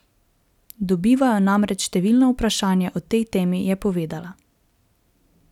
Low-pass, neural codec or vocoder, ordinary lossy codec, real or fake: 19.8 kHz; none; none; real